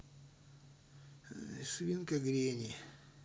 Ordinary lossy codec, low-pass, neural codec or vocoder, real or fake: none; none; none; real